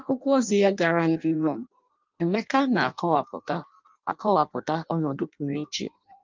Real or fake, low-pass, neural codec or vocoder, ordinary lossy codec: fake; 7.2 kHz; codec, 16 kHz in and 24 kHz out, 0.6 kbps, FireRedTTS-2 codec; Opus, 24 kbps